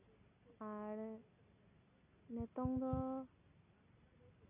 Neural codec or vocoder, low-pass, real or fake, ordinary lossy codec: none; 3.6 kHz; real; none